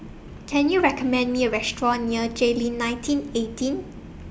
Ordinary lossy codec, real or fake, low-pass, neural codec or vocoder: none; real; none; none